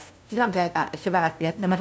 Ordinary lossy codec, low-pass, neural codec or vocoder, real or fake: none; none; codec, 16 kHz, 0.5 kbps, FunCodec, trained on LibriTTS, 25 frames a second; fake